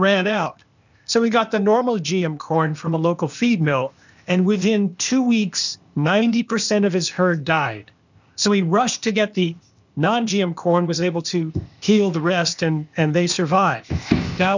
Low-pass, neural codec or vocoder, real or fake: 7.2 kHz; codec, 16 kHz, 0.8 kbps, ZipCodec; fake